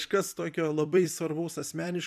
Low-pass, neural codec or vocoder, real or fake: 14.4 kHz; vocoder, 44.1 kHz, 128 mel bands every 256 samples, BigVGAN v2; fake